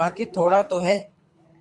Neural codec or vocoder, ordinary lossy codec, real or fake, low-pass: codec, 24 kHz, 3 kbps, HILCodec; MP3, 64 kbps; fake; 10.8 kHz